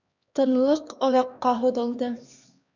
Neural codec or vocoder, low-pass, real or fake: codec, 16 kHz, 2 kbps, X-Codec, HuBERT features, trained on LibriSpeech; 7.2 kHz; fake